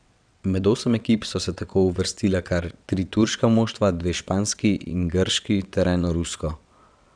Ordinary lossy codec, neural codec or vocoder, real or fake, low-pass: none; vocoder, 22.05 kHz, 80 mel bands, WaveNeXt; fake; 9.9 kHz